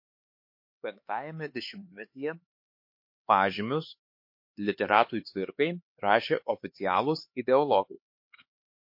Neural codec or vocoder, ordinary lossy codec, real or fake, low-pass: codec, 16 kHz, 4 kbps, X-Codec, HuBERT features, trained on LibriSpeech; MP3, 32 kbps; fake; 5.4 kHz